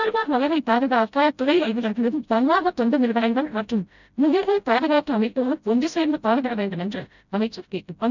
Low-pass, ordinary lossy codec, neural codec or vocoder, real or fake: 7.2 kHz; none; codec, 16 kHz, 0.5 kbps, FreqCodec, smaller model; fake